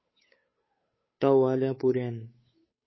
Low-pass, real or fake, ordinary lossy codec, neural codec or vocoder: 7.2 kHz; fake; MP3, 24 kbps; codec, 16 kHz, 8 kbps, FunCodec, trained on Chinese and English, 25 frames a second